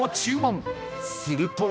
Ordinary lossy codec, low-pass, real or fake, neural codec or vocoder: none; none; fake; codec, 16 kHz, 1 kbps, X-Codec, HuBERT features, trained on balanced general audio